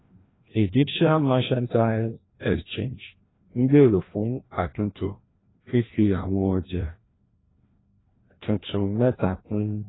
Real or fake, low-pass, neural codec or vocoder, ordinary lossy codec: fake; 7.2 kHz; codec, 16 kHz, 1 kbps, FreqCodec, larger model; AAC, 16 kbps